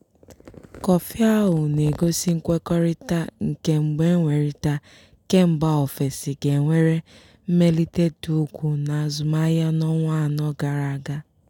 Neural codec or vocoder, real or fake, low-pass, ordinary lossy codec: none; real; 19.8 kHz; none